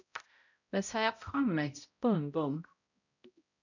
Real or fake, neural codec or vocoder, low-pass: fake; codec, 16 kHz, 0.5 kbps, X-Codec, HuBERT features, trained on balanced general audio; 7.2 kHz